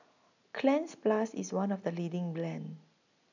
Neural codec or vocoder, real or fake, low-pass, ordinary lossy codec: none; real; 7.2 kHz; none